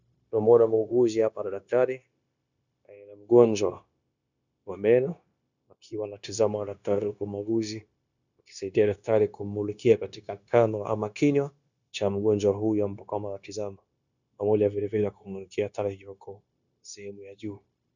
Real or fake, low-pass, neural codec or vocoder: fake; 7.2 kHz; codec, 16 kHz, 0.9 kbps, LongCat-Audio-Codec